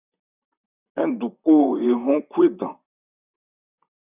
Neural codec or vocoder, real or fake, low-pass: vocoder, 44.1 kHz, 128 mel bands, Pupu-Vocoder; fake; 3.6 kHz